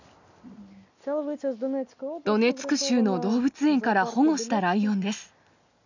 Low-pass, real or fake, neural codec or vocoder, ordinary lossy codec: 7.2 kHz; real; none; none